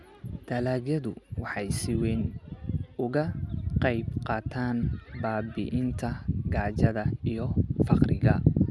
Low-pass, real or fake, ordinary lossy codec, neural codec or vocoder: none; real; none; none